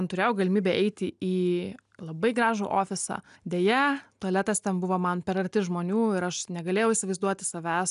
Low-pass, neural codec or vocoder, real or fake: 10.8 kHz; none; real